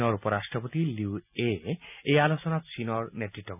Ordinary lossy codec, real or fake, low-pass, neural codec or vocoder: none; real; 3.6 kHz; none